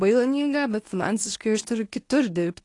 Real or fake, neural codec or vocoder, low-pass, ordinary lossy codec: fake; codec, 24 kHz, 0.9 kbps, WavTokenizer, medium speech release version 1; 10.8 kHz; AAC, 48 kbps